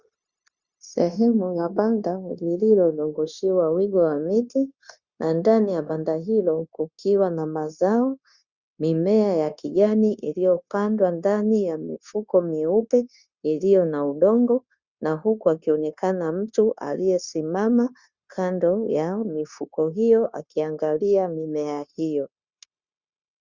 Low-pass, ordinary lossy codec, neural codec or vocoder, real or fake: 7.2 kHz; Opus, 64 kbps; codec, 16 kHz, 0.9 kbps, LongCat-Audio-Codec; fake